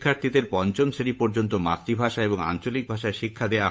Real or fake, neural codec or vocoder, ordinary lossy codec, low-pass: fake; codec, 16 kHz, 8 kbps, FunCodec, trained on Chinese and English, 25 frames a second; none; none